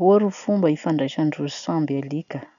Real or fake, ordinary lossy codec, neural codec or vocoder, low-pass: real; none; none; 7.2 kHz